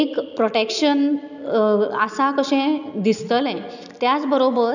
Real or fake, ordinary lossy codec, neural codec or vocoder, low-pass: real; none; none; 7.2 kHz